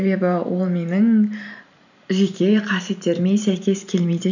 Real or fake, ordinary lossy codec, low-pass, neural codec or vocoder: fake; none; 7.2 kHz; vocoder, 44.1 kHz, 128 mel bands every 512 samples, BigVGAN v2